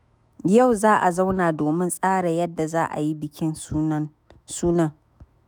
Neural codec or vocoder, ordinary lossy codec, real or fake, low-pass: autoencoder, 48 kHz, 128 numbers a frame, DAC-VAE, trained on Japanese speech; none; fake; none